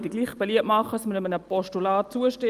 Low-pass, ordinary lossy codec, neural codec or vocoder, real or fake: 14.4 kHz; Opus, 32 kbps; none; real